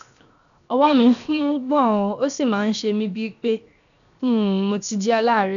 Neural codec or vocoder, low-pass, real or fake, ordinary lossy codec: codec, 16 kHz, 0.7 kbps, FocalCodec; 7.2 kHz; fake; none